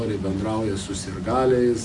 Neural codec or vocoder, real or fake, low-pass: none; real; 10.8 kHz